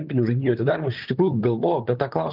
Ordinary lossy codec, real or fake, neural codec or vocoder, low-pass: Opus, 24 kbps; fake; vocoder, 44.1 kHz, 128 mel bands, Pupu-Vocoder; 5.4 kHz